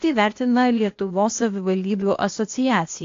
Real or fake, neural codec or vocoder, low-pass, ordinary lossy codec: fake; codec, 16 kHz, 0.8 kbps, ZipCodec; 7.2 kHz; AAC, 48 kbps